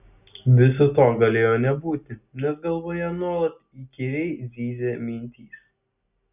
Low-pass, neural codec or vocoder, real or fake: 3.6 kHz; none; real